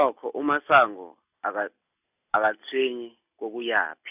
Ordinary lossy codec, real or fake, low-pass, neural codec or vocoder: none; real; 3.6 kHz; none